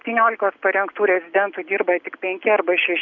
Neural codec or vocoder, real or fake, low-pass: none; real; 7.2 kHz